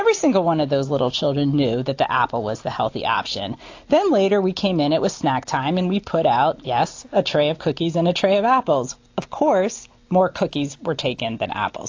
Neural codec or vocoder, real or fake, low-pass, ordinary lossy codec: none; real; 7.2 kHz; AAC, 48 kbps